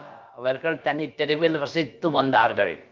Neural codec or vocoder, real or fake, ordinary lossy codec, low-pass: codec, 16 kHz, about 1 kbps, DyCAST, with the encoder's durations; fake; Opus, 32 kbps; 7.2 kHz